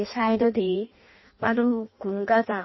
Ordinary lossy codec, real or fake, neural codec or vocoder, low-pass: MP3, 24 kbps; fake; codec, 16 kHz in and 24 kHz out, 0.6 kbps, FireRedTTS-2 codec; 7.2 kHz